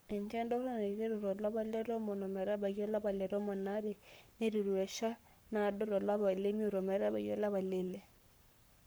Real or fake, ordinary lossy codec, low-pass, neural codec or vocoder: fake; none; none; codec, 44.1 kHz, 7.8 kbps, Pupu-Codec